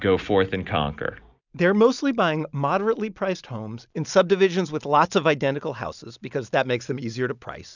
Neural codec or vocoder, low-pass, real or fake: none; 7.2 kHz; real